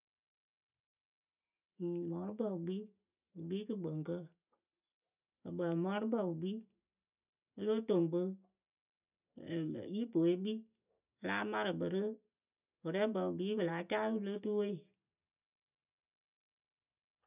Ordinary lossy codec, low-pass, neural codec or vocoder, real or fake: none; 3.6 kHz; none; real